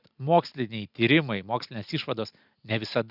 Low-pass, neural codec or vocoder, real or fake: 5.4 kHz; none; real